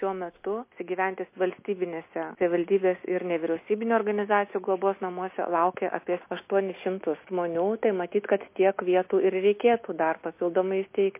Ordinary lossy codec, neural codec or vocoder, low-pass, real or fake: MP3, 24 kbps; none; 3.6 kHz; real